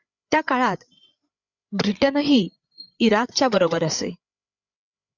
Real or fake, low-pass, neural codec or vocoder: fake; 7.2 kHz; codec, 16 kHz, 8 kbps, FreqCodec, larger model